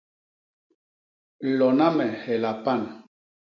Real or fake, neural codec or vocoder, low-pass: real; none; 7.2 kHz